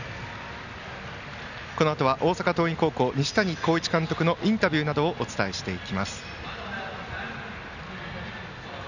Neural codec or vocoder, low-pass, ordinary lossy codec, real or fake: none; 7.2 kHz; none; real